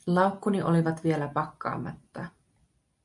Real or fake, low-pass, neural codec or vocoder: real; 10.8 kHz; none